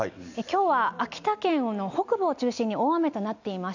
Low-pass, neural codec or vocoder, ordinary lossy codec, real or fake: 7.2 kHz; none; none; real